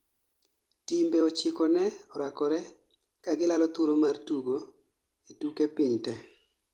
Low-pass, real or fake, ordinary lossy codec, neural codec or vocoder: 19.8 kHz; fake; Opus, 24 kbps; vocoder, 44.1 kHz, 128 mel bands every 256 samples, BigVGAN v2